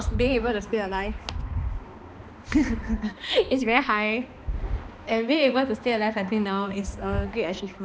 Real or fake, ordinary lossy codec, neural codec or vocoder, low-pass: fake; none; codec, 16 kHz, 2 kbps, X-Codec, HuBERT features, trained on balanced general audio; none